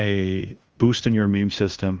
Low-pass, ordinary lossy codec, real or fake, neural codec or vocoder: 7.2 kHz; Opus, 24 kbps; fake; codec, 16 kHz in and 24 kHz out, 0.9 kbps, LongCat-Audio-Codec, fine tuned four codebook decoder